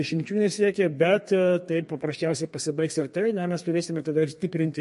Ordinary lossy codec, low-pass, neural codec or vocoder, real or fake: MP3, 48 kbps; 14.4 kHz; codec, 32 kHz, 1.9 kbps, SNAC; fake